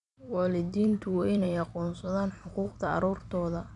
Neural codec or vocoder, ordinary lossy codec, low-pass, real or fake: vocoder, 44.1 kHz, 128 mel bands every 256 samples, BigVGAN v2; none; 10.8 kHz; fake